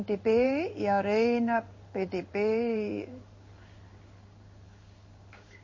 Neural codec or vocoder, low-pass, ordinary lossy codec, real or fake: codec, 16 kHz in and 24 kHz out, 1 kbps, XY-Tokenizer; 7.2 kHz; MP3, 32 kbps; fake